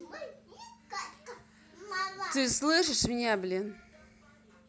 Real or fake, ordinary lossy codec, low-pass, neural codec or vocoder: real; none; none; none